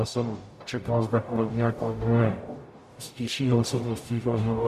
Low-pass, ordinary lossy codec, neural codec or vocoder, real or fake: 14.4 kHz; MP3, 64 kbps; codec, 44.1 kHz, 0.9 kbps, DAC; fake